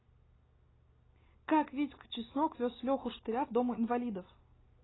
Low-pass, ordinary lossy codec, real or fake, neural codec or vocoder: 7.2 kHz; AAC, 16 kbps; real; none